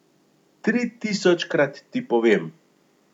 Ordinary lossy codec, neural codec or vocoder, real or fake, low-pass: none; none; real; 19.8 kHz